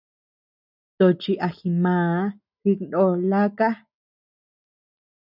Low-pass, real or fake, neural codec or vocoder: 5.4 kHz; real; none